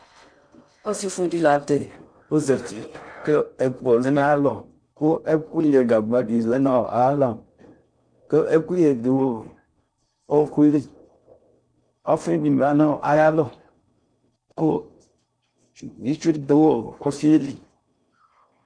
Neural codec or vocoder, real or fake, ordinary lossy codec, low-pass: codec, 16 kHz in and 24 kHz out, 0.6 kbps, FocalCodec, streaming, 4096 codes; fake; MP3, 64 kbps; 9.9 kHz